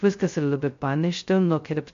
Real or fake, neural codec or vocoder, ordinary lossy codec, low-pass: fake; codec, 16 kHz, 0.2 kbps, FocalCodec; MP3, 48 kbps; 7.2 kHz